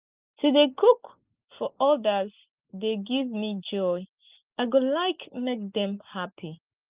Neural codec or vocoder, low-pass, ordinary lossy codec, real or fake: none; 3.6 kHz; Opus, 32 kbps; real